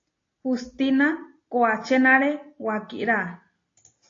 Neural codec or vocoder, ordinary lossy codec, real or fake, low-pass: none; AAC, 32 kbps; real; 7.2 kHz